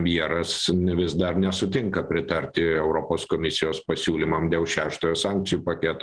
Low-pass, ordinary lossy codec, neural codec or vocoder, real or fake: 9.9 kHz; Opus, 16 kbps; none; real